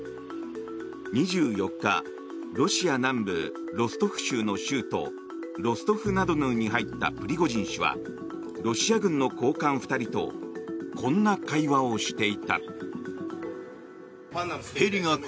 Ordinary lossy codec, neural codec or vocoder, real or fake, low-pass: none; none; real; none